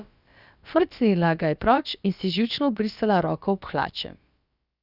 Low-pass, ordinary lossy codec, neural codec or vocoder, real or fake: 5.4 kHz; Opus, 64 kbps; codec, 16 kHz, about 1 kbps, DyCAST, with the encoder's durations; fake